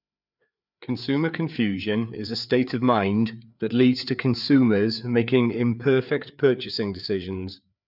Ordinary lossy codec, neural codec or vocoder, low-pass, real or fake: none; codec, 16 kHz, 4 kbps, FreqCodec, larger model; 5.4 kHz; fake